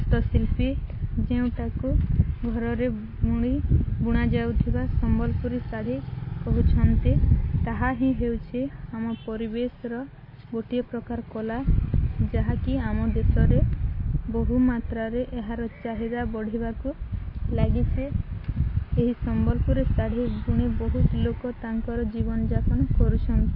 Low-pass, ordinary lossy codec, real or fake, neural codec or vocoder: 5.4 kHz; MP3, 24 kbps; real; none